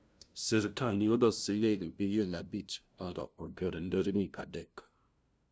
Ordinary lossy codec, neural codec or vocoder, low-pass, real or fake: none; codec, 16 kHz, 0.5 kbps, FunCodec, trained on LibriTTS, 25 frames a second; none; fake